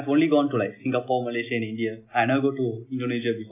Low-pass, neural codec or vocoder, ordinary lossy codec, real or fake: 3.6 kHz; none; none; real